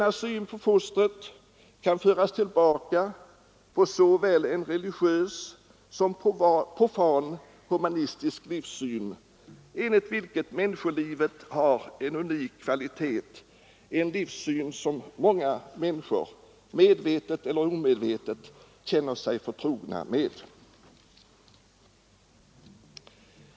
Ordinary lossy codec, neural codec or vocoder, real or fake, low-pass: none; none; real; none